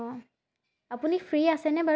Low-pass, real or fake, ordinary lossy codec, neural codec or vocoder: none; real; none; none